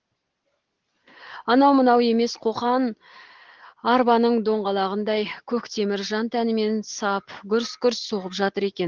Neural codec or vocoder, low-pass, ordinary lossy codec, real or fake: none; 7.2 kHz; Opus, 16 kbps; real